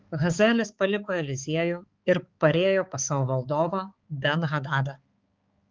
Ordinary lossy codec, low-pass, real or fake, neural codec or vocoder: Opus, 24 kbps; 7.2 kHz; fake; codec, 16 kHz, 4 kbps, X-Codec, HuBERT features, trained on balanced general audio